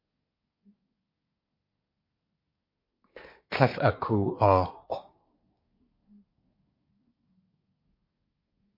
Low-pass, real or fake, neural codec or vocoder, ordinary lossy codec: 5.4 kHz; fake; codec, 24 kHz, 1 kbps, SNAC; MP3, 32 kbps